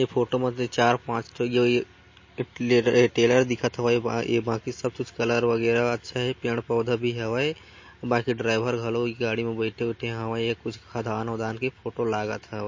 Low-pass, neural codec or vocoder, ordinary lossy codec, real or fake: 7.2 kHz; none; MP3, 32 kbps; real